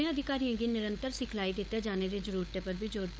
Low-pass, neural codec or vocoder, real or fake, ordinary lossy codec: none; codec, 16 kHz, 16 kbps, FunCodec, trained on LibriTTS, 50 frames a second; fake; none